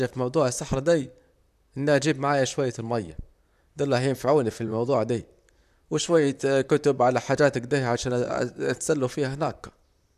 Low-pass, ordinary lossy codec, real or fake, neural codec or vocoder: 14.4 kHz; none; fake; vocoder, 44.1 kHz, 128 mel bands, Pupu-Vocoder